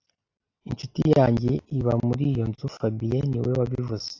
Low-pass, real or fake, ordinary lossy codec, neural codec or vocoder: 7.2 kHz; real; AAC, 48 kbps; none